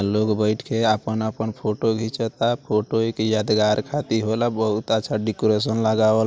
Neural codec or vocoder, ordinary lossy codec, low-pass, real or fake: none; none; none; real